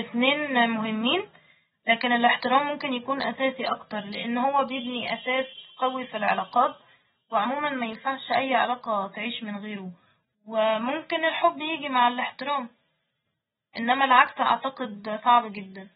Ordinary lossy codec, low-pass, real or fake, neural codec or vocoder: AAC, 16 kbps; 14.4 kHz; real; none